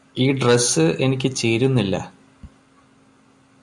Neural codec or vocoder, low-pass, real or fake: none; 10.8 kHz; real